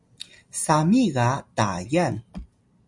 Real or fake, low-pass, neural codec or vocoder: real; 10.8 kHz; none